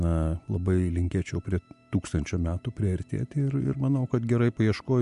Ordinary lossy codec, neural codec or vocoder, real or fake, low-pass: MP3, 64 kbps; none; real; 10.8 kHz